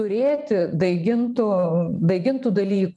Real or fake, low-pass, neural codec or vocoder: fake; 10.8 kHz; vocoder, 24 kHz, 100 mel bands, Vocos